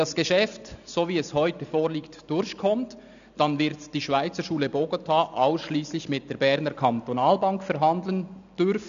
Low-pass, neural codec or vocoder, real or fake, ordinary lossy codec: 7.2 kHz; none; real; none